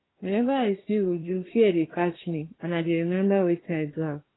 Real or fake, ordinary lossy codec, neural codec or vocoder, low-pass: fake; AAC, 16 kbps; codec, 24 kHz, 1 kbps, SNAC; 7.2 kHz